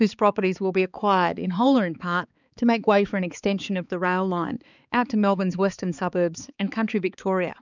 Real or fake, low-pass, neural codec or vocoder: fake; 7.2 kHz; codec, 16 kHz, 4 kbps, X-Codec, HuBERT features, trained on balanced general audio